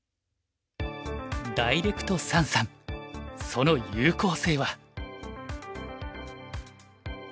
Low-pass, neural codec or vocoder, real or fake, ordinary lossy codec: none; none; real; none